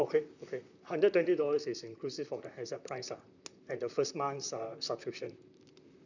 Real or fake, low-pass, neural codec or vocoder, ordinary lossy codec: fake; 7.2 kHz; vocoder, 44.1 kHz, 128 mel bands, Pupu-Vocoder; none